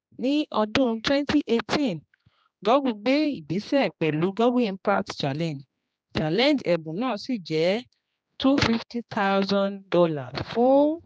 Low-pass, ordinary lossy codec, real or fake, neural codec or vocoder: none; none; fake; codec, 16 kHz, 2 kbps, X-Codec, HuBERT features, trained on general audio